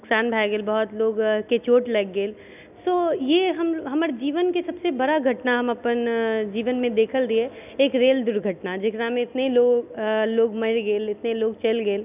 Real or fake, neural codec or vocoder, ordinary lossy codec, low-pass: real; none; none; 3.6 kHz